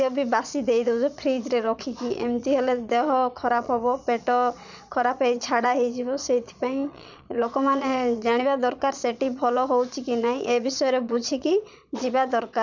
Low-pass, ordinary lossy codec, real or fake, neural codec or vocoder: 7.2 kHz; none; fake; vocoder, 22.05 kHz, 80 mel bands, Vocos